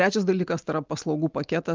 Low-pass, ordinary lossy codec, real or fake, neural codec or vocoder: 7.2 kHz; Opus, 32 kbps; real; none